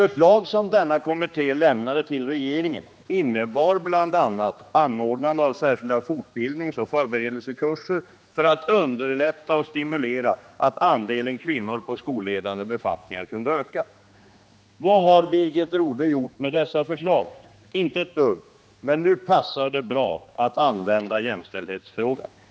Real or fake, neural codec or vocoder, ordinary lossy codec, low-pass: fake; codec, 16 kHz, 2 kbps, X-Codec, HuBERT features, trained on general audio; none; none